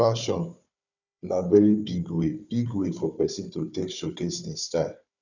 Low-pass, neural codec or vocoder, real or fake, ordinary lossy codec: 7.2 kHz; codec, 16 kHz, 4 kbps, FunCodec, trained on Chinese and English, 50 frames a second; fake; none